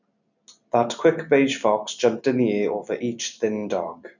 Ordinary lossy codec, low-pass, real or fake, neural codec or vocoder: none; 7.2 kHz; real; none